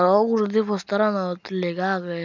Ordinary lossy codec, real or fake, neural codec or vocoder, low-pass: none; real; none; 7.2 kHz